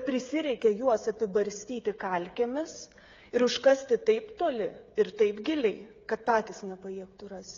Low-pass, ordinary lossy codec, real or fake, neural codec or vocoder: 7.2 kHz; AAC, 32 kbps; fake; codec, 16 kHz, 8 kbps, FreqCodec, smaller model